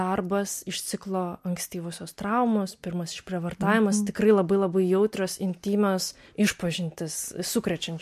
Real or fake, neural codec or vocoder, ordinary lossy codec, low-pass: real; none; MP3, 64 kbps; 14.4 kHz